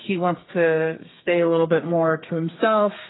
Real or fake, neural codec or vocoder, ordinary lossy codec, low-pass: fake; codec, 32 kHz, 1.9 kbps, SNAC; AAC, 16 kbps; 7.2 kHz